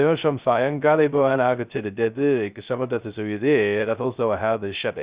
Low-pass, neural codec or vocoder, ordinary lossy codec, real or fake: 3.6 kHz; codec, 16 kHz, 0.2 kbps, FocalCodec; Opus, 64 kbps; fake